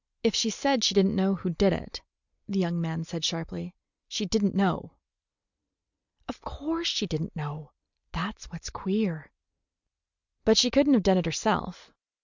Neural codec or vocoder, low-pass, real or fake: none; 7.2 kHz; real